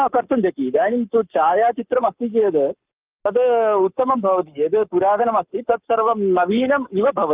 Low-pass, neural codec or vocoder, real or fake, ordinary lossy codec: 3.6 kHz; codec, 44.1 kHz, 7.8 kbps, Pupu-Codec; fake; Opus, 24 kbps